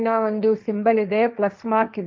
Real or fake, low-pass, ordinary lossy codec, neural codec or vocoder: fake; none; none; codec, 16 kHz, 1.1 kbps, Voila-Tokenizer